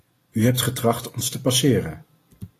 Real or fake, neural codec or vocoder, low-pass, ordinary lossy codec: real; none; 14.4 kHz; AAC, 48 kbps